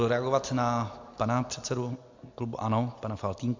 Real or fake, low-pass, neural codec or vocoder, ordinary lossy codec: real; 7.2 kHz; none; MP3, 64 kbps